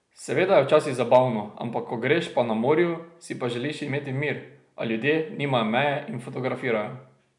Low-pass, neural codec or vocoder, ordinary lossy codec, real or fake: 10.8 kHz; none; none; real